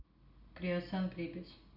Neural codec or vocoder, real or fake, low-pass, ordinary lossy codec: none; real; 5.4 kHz; none